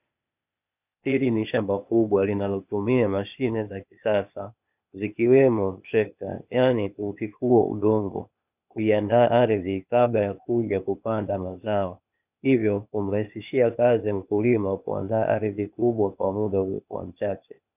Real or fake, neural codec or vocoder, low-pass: fake; codec, 16 kHz, 0.8 kbps, ZipCodec; 3.6 kHz